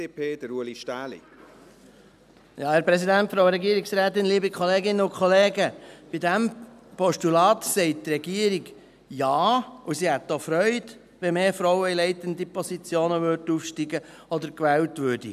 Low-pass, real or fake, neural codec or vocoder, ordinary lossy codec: 14.4 kHz; real; none; none